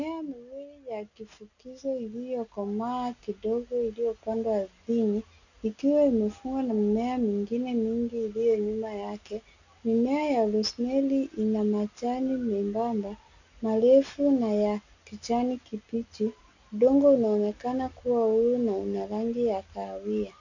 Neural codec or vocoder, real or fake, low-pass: none; real; 7.2 kHz